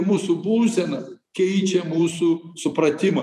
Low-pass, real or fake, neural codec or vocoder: 14.4 kHz; fake; vocoder, 44.1 kHz, 128 mel bands every 256 samples, BigVGAN v2